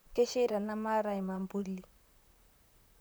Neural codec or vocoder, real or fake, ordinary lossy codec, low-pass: vocoder, 44.1 kHz, 128 mel bands, Pupu-Vocoder; fake; none; none